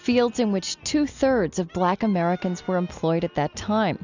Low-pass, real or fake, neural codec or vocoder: 7.2 kHz; real; none